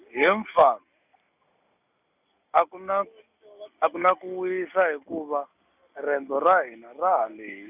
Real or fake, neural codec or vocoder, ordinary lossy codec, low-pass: real; none; none; 3.6 kHz